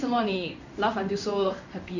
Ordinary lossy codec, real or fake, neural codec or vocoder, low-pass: none; fake; codec, 16 kHz in and 24 kHz out, 1 kbps, XY-Tokenizer; 7.2 kHz